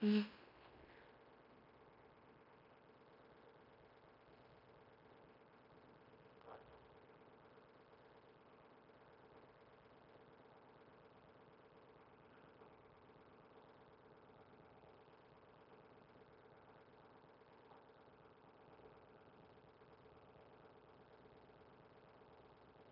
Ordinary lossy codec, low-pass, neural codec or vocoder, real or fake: none; 5.4 kHz; codec, 16 kHz in and 24 kHz out, 0.9 kbps, LongCat-Audio-Codec, fine tuned four codebook decoder; fake